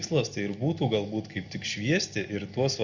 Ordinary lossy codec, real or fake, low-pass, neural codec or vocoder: Opus, 64 kbps; real; 7.2 kHz; none